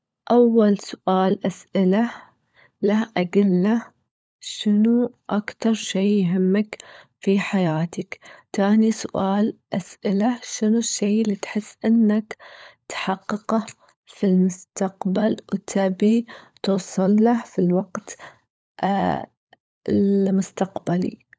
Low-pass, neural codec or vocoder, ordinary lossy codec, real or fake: none; codec, 16 kHz, 16 kbps, FunCodec, trained on LibriTTS, 50 frames a second; none; fake